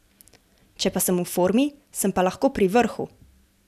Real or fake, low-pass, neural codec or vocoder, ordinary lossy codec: real; 14.4 kHz; none; none